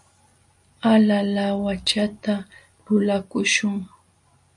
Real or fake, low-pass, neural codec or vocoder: real; 10.8 kHz; none